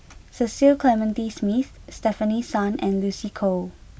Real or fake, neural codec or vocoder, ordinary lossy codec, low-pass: real; none; none; none